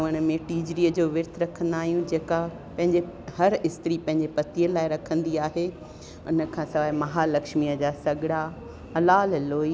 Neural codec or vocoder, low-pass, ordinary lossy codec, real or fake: none; none; none; real